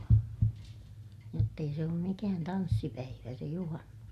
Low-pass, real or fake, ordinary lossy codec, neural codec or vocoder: 14.4 kHz; real; none; none